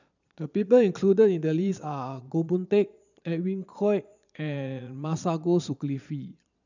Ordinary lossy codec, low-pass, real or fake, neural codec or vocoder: none; 7.2 kHz; fake; vocoder, 22.05 kHz, 80 mel bands, Vocos